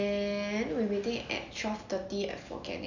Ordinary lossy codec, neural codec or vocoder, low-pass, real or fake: none; none; 7.2 kHz; real